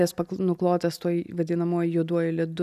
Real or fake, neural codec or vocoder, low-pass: real; none; 14.4 kHz